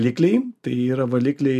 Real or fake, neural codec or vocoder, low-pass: real; none; 14.4 kHz